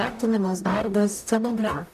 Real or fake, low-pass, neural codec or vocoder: fake; 14.4 kHz; codec, 44.1 kHz, 0.9 kbps, DAC